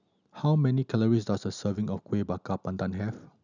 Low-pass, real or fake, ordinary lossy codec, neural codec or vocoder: 7.2 kHz; real; none; none